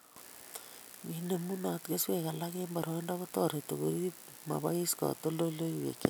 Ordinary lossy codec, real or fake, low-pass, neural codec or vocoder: none; real; none; none